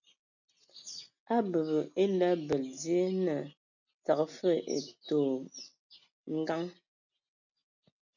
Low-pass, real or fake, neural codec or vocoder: 7.2 kHz; real; none